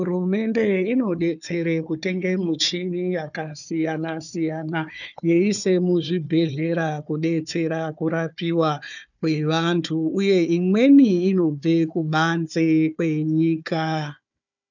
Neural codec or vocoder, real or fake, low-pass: codec, 16 kHz, 4 kbps, FunCodec, trained on Chinese and English, 50 frames a second; fake; 7.2 kHz